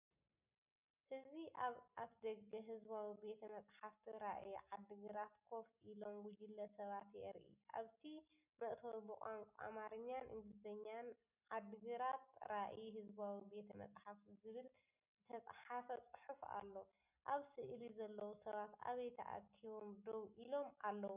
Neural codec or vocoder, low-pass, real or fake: none; 3.6 kHz; real